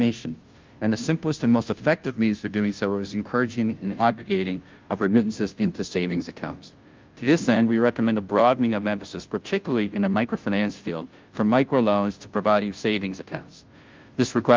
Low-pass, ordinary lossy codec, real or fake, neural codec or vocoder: 7.2 kHz; Opus, 24 kbps; fake; codec, 16 kHz, 0.5 kbps, FunCodec, trained on Chinese and English, 25 frames a second